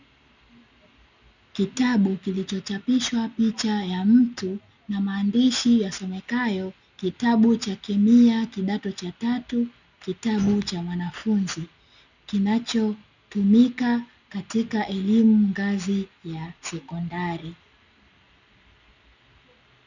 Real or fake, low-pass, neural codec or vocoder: real; 7.2 kHz; none